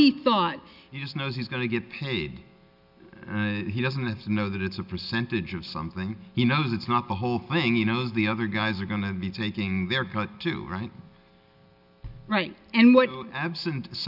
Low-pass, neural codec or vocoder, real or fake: 5.4 kHz; none; real